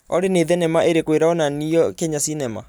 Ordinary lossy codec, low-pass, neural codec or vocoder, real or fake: none; none; none; real